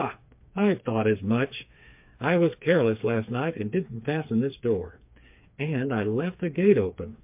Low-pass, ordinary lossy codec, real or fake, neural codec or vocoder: 3.6 kHz; MP3, 32 kbps; fake; codec, 16 kHz, 4 kbps, FreqCodec, smaller model